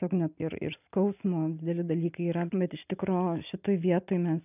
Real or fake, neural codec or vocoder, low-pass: real; none; 3.6 kHz